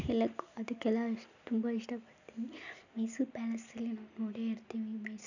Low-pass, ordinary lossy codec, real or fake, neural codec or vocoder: 7.2 kHz; none; real; none